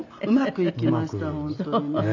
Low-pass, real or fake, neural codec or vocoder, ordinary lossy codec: 7.2 kHz; real; none; none